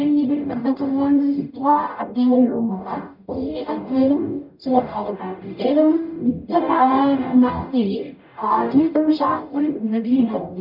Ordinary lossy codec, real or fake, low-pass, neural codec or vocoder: none; fake; 5.4 kHz; codec, 44.1 kHz, 0.9 kbps, DAC